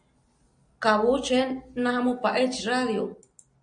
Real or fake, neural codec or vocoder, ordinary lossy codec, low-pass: real; none; MP3, 48 kbps; 9.9 kHz